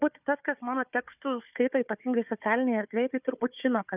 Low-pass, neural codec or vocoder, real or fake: 3.6 kHz; codec, 16 kHz, 4 kbps, FunCodec, trained on Chinese and English, 50 frames a second; fake